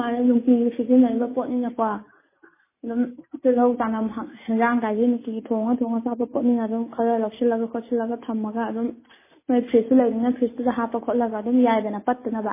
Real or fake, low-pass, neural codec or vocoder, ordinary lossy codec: real; 3.6 kHz; none; MP3, 16 kbps